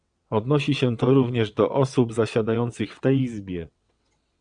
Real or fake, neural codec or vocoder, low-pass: fake; vocoder, 22.05 kHz, 80 mel bands, WaveNeXt; 9.9 kHz